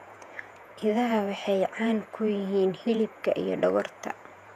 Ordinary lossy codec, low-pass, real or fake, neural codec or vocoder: none; 14.4 kHz; fake; vocoder, 48 kHz, 128 mel bands, Vocos